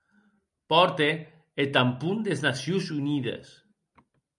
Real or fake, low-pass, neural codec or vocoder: real; 10.8 kHz; none